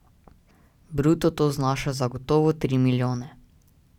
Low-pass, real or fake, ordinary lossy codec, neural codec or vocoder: 19.8 kHz; real; none; none